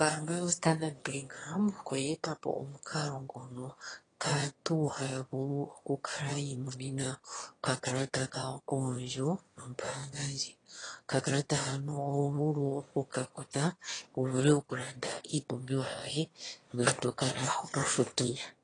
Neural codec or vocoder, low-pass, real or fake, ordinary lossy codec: autoencoder, 22.05 kHz, a latent of 192 numbers a frame, VITS, trained on one speaker; 9.9 kHz; fake; AAC, 32 kbps